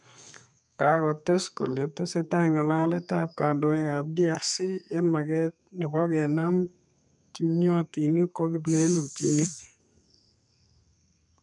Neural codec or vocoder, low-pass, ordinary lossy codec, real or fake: codec, 32 kHz, 1.9 kbps, SNAC; 10.8 kHz; none; fake